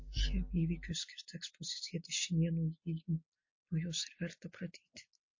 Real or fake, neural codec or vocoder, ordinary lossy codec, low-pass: real; none; MP3, 32 kbps; 7.2 kHz